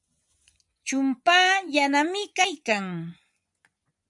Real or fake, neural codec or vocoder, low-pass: fake; vocoder, 24 kHz, 100 mel bands, Vocos; 10.8 kHz